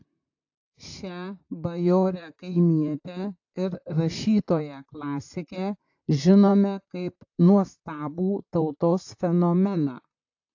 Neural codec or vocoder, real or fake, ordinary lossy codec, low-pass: vocoder, 44.1 kHz, 128 mel bands, Pupu-Vocoder; fake; AAC, 48 kbps; 7.2 kHz